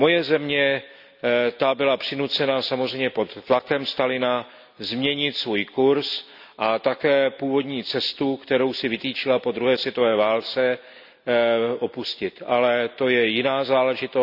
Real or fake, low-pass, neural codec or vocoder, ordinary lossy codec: real; 5.4 kHz; none; none